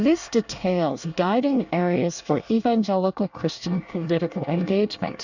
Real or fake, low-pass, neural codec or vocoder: fake; 7.2 kHz; codec, 24 kHz, 1 kbps, SNAC